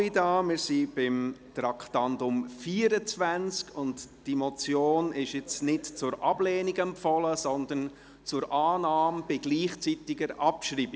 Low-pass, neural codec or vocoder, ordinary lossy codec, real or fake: none; none; none; real